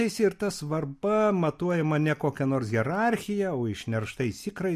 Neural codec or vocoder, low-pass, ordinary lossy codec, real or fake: none; 14.4 kHz; MP3, 64 kbps; real